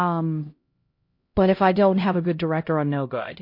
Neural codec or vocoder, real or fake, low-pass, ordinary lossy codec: codec, 16 kHz, 0.5 kbps, X-Codec, HuBERT features, trained on LibriSpeech; fake; 5.4 kHz; MP3, 32 kbps